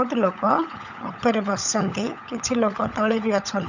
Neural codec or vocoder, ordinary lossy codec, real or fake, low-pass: codec, 16 kHz, 16 kbps, FunCodec, trained on LibriTTS, 50 frames a second; none; fake; 7.2 kHz